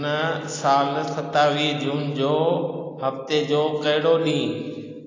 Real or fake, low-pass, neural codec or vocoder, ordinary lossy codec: real; 7.2 kHz; none; AAC, 32 kbps